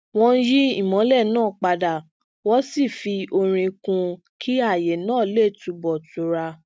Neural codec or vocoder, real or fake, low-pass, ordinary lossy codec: none; real; none; none